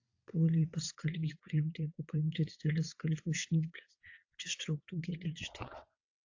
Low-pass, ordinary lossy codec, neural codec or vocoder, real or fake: 7.2 kHz; AAC, 48 kbps; vocoder, 22.05 kHz, 80 mel bands, WaveNeXt; fake